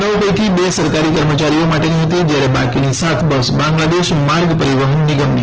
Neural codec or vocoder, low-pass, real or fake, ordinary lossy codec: none; 7.2 kHz; real; Opus, 16 kbps